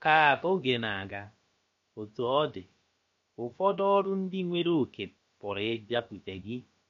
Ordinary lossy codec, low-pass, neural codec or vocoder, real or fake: MP3, 48 kbps; 7.2 kHz; codec, 16 kHz, about 1 kbps, DyCAST, with the encoder's durations; fake